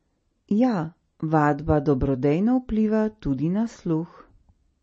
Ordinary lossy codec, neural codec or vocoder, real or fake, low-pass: MP3, 32 kbps; none; real; 10.8 kHz